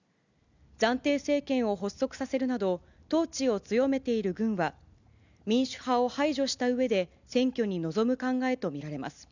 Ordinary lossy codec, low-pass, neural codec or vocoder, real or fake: none; 7.2 kHz; none; real